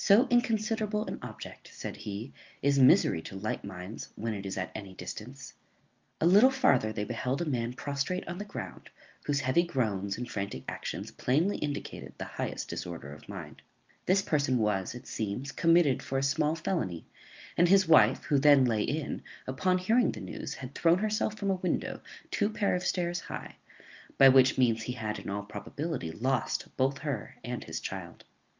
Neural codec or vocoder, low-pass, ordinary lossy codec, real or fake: none; 7.2 kHz; Opus, 24 kbps; real